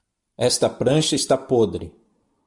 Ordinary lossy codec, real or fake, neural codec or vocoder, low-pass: MP3, 64 kbps; real; none; 10.8 kHz